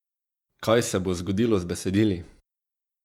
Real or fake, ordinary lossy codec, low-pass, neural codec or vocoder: fake; MP3, 96 kbps; 19.8 kHz; vocoder, 48 kHz, 128 mel bands, Vocos